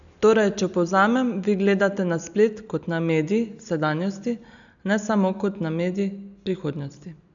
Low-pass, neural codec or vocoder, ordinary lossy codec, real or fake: 7.2 kHz; none; none; real